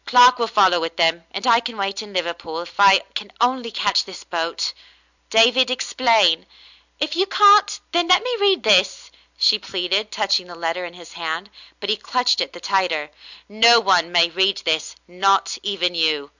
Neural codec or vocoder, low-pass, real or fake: none; 7.2 kHz; real